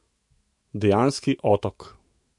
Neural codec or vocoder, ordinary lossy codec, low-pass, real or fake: autoencoder, 48 kHz, 128 numbers a frame, DAC-VAE, trained on Japanese speech; MP3, 48 kbps; 10.8 kHz; fake